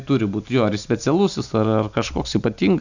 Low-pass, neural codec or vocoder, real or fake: 7.2 kHz; none; real